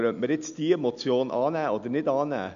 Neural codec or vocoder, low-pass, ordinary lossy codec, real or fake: none; 7.2 kHz; none; real